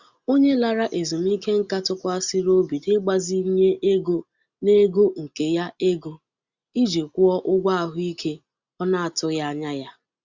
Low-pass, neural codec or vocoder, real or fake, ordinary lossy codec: 7.2 kHz; none; real; Opus, 64 kbps